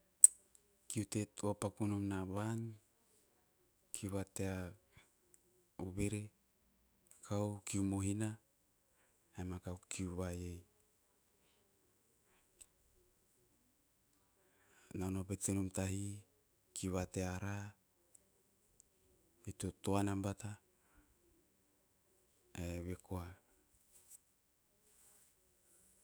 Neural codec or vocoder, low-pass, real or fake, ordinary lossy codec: autoencoder, 48 kHz, 128 numbers a frame, DAC-VAE, trained on Japanese speech; none; fake; none